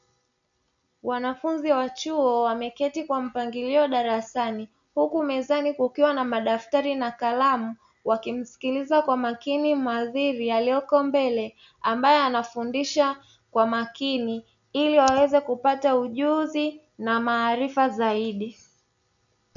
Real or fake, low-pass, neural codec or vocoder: real; 7.2 kHz; none